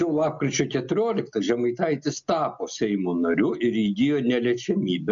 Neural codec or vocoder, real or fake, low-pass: none; real; 7.2 kHz